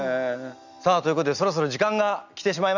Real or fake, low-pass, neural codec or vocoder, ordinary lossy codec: real; 7.2 kHz; none; none